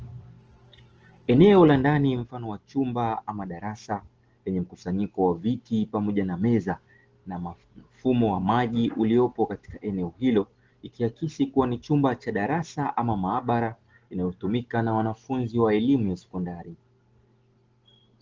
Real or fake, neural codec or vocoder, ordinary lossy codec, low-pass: real; none; Opus, 16 kbps; 7.2 kHz